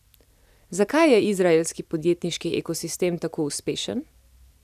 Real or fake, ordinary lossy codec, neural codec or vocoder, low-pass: real; none; none; 14.4 kHz